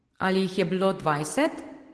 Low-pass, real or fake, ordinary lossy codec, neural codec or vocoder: 10.8 kHz; real; Opus, 16 kbps; none